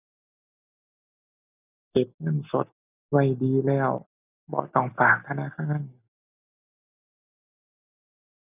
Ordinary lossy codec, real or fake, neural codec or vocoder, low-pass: none; real; none; 3.6 kHz